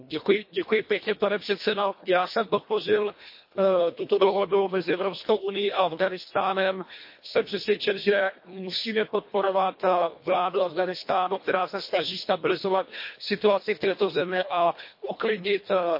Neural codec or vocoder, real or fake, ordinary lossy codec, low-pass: codec, 24 kHz, 1.5 kbps, HILCodec; fake; MP3, 32 kbps; 5.4 kHz